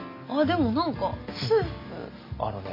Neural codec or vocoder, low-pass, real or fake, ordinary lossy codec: none; 5.4 kHz; real; AAC, 32 kbps